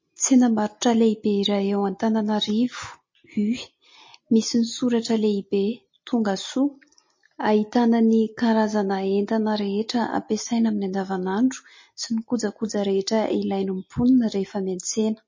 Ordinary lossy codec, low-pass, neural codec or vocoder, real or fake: MP3, 32 kbps; 7.2 kHz; none; real